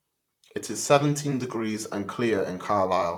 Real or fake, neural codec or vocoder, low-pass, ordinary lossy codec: fake; vocoder, 44.1 kHz, 128 mel bands, Pupu-Vocoder; 19.8 kHz; none